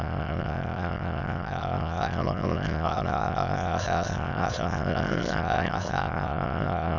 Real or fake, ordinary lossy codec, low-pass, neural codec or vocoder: fake; none; 7.2 kHz; autoencoder, 22.05 kHz, a latent of 192 numbers a frame, VITS, trained on many speakers